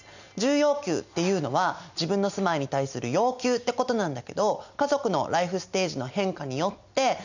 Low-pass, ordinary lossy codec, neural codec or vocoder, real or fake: 7.2 kHz; none; none; real